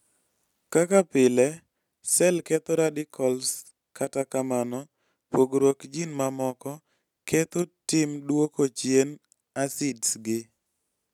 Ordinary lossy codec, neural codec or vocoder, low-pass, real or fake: none; none; 19.8 kHz; real